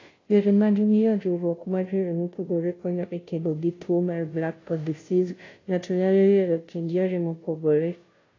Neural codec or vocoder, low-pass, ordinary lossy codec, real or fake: codec, 16 kHz, 0.5 kbps, FunCodec, trained on Chinese and English, 25 frames a second; 7.2 kHz; AAC, 32 kbps; fake